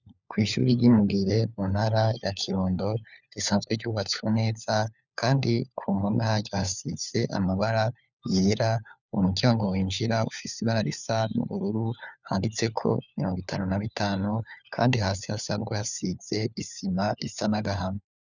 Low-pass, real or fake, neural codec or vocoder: 7.2 kHz; fake; codec, 16 kHz, 4 kbps, FunCodec, trained on LibriTTS, 50 frames a second